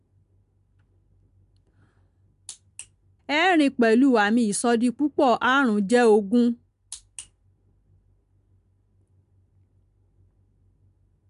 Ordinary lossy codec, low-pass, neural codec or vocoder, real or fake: MP3, 64 kbps; 10.8 kHz; none; real